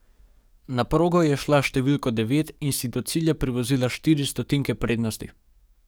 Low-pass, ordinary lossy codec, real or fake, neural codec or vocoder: none; none; fake; codec, 44.1 kHz, 7.8 kbps, DAC